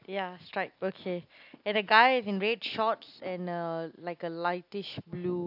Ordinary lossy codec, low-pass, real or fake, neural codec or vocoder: AAC, 48 kbps; 5.4 kHz; real; none